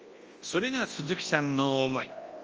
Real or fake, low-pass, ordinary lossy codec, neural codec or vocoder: fake; 7.2 kHz; Opus, 24 kbps; codec, 24 kHz, 0.9 kbps, WavTokenizer, large speech release